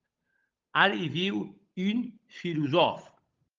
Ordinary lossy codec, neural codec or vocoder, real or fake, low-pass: Opus, 24 kbps; codec, 16 kHz, 8 kbps, FunCodec, trained on Chinese and English, 25 frames a second; fake; 7.2 kHz